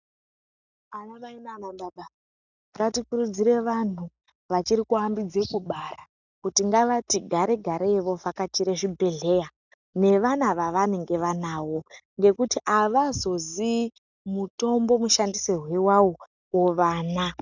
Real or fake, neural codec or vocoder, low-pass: real; none; 7.2 kHz